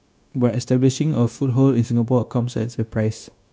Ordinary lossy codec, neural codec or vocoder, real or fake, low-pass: none; codec, 16 kHz, 0.9 kbps, LongCat-Audio-Codec; fake; none